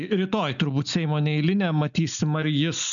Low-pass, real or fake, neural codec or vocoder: 7.2 kHz; real; none